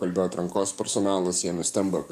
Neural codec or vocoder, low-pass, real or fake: codec, 44.1 kHz, 7.8 kbps, DAC; 14.4 kHz; fake